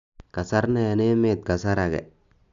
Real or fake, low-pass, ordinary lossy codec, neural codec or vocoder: real; 7.2 kHz; none; none